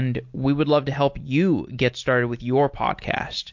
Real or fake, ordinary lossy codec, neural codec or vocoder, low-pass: real; MP3, 48 kbps; none; 7.2 kHz